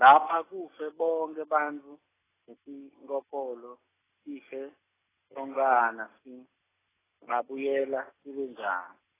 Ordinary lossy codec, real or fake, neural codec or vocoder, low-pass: AAC, 16 kbps; real; none; 3.6 kHz